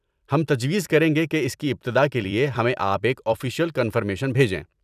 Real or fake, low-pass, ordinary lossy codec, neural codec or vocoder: fake; 14.4 kHz; none; vocoder, 44.1 kHz, 128 mel bands every 256 samples, BigVGAN v2